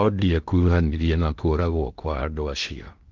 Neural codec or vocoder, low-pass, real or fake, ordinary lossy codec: codec, 16 kHz in and 24 kHz out, 0.8 kbps, FocalCodec, streaming, 65536 codes; 7.2 kHz; fake; Opus, 32 kbps